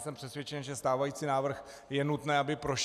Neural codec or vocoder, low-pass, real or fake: none; 14.4 kHz; real